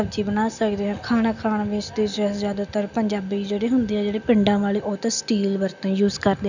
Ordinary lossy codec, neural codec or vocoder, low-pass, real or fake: none; none; 7.2 kHz; real